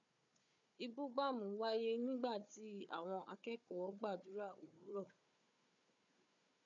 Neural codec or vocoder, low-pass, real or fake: codec, 16 kHz, 4 kbps, FunCodec, trained on Chinese and English, 50 frames a second; 7.2 kHz; fake